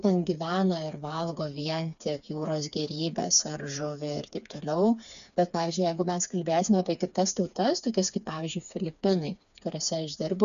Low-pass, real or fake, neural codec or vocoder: 7.2 kHz; fake; codec, 16 kHz, 4 kbps, FreqCodec, smaller model